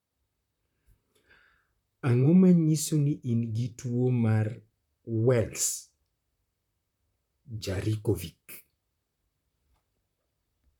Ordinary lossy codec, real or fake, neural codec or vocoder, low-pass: none; fake; vocoder, 44.1 kHz, 128 mel bands, Pupu-Vocoder; 19.8 kHz